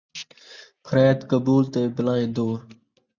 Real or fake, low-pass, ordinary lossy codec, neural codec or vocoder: real; 7.2 kHz; Opus, 64 kbps; none